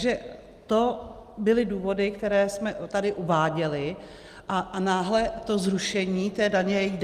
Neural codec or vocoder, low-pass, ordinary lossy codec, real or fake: none; 14.4 kHz; Opus, 32 kbps; real